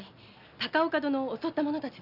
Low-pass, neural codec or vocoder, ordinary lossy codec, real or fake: 5.4 kHz; none; none; real